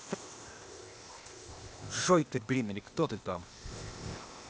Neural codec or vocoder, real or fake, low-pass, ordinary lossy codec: codec, 16 kHz, 0.8 kbps, ZipCodec; fake; none; none